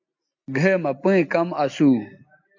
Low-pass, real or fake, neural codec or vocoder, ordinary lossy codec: 7.2 kHz; real; none; MP3, 48 kbps